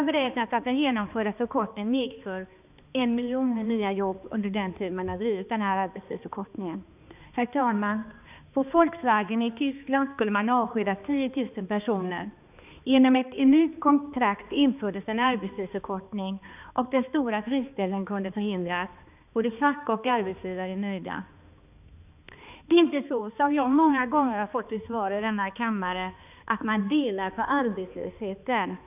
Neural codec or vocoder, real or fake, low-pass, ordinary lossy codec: codec, 16 kHz, 2 kbps, X-Codec, HuBERT features, trained on balanced general audio; fake; 3.6 kHz; none